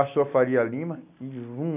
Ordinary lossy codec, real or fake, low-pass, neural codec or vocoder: none; real; 3.6 kHz; none